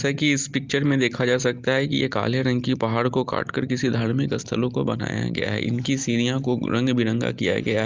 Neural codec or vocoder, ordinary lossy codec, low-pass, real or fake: none; Opus, 32 kbps; 7.2 kHz; real